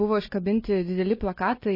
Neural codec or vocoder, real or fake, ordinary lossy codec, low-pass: none; real; MP3, 24 kbps; 5.4 kHz